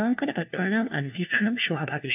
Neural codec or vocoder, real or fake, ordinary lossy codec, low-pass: codec, 16 kHz, 1 kbps, FunCodec, trained on LibriTTS, 50 frames a second; fake; AAC, 32 kbps; 3.6 kHz